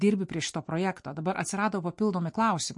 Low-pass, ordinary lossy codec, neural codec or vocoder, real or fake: 10.8 kHz; MP3, 48 kbps; none; real